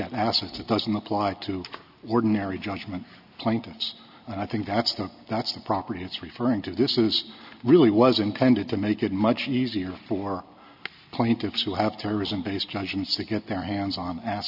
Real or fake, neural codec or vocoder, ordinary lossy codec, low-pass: real; none; AAC, 48 kbps; 5.4 kHz